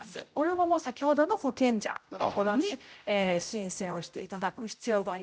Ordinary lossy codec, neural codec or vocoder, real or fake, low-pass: none; codec, 16 kHz, 0.5 kbps, X-Codec, HuBERT features, trained on general audio; fake; none